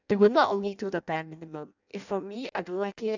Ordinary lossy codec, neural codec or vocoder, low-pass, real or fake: none; codec, 16 kHz in and 24 kHz out, 0.6 kbps, FireRedTTS-2 codec; 7.2 kHz; fake